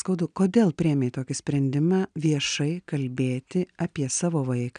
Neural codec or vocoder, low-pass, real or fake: none; 9.9 kHz; real